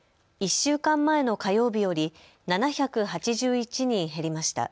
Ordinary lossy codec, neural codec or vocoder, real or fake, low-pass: none; none; real; none